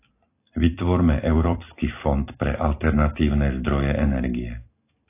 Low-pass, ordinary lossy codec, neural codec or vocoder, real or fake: 3.6 kHz; AAC, 24 kbps; vocoder, 44.1 kHz, 128 mel bands every 256 samples, BigVGAN v2; fake